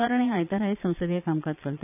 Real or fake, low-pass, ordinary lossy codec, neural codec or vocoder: fake; 3.6 kHz; none; vocoder, 22.05 kHz, 80 mel bands, Vocos